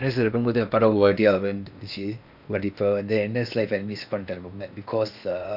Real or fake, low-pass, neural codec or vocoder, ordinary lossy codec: fake; 5.4 kHz; codec, 16 kHz in and 24 kHz out, 0.8 kbps, FocalCodec, streaming, 65536 codes; none